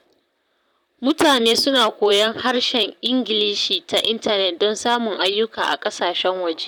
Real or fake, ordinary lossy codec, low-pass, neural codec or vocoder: fake; none; 19.8 kHz; vocoder, 44.1 kHz, 128 mel bands, Pupu-Vocoder